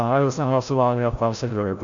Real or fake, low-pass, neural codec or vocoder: fake; 7.2 kHz; codec, 16 kHz, 0.5 kbps, FreqCodec, larger model